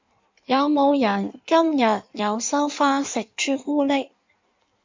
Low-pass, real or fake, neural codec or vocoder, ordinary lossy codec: 7.2 kHz; fake; codec, 16 kHz in and 24 kHz out, 1.1 kbps, FireRedTTS-2 codec; MP3, 64 kbps